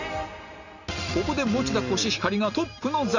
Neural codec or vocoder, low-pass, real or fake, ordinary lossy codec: none; 7.2 kHz; real; none